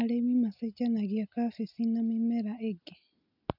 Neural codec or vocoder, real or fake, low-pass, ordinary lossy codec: none; real; 5.4 kHz; none